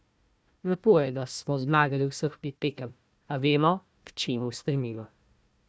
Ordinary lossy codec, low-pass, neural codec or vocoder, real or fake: none; none; codec, 16 kHz, 1 kbps, FunCodec, trained on Chinese and English, 50 frames a second; fake